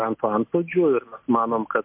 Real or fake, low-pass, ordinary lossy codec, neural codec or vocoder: real; 3.6 kHz; MP3, 32 kbps; none